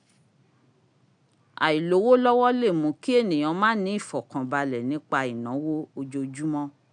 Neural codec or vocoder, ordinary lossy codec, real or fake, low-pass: none; none; real; 9.9 kHz